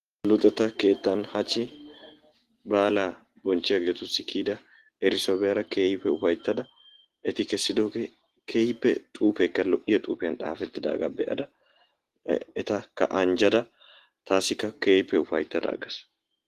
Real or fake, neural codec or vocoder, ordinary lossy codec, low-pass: real; none; Opus, 16 kbps; 14.4 kHz